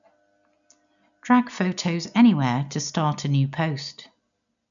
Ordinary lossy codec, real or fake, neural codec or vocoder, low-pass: none; real; none; 7.2 kHz